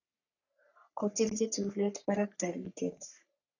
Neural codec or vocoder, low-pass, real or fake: codec, 44.1 kHz, 3.4 kbps, Pupu-Codec; 7.2 kHz; fake